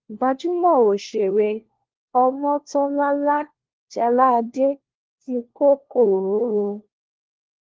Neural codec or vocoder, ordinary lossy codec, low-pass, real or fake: codec, 16 kHz, 1 kbps, FunCodec, trained on LibriTTS, 50 frames a second; Opus, 16 kbps; 7.2 kHz; fake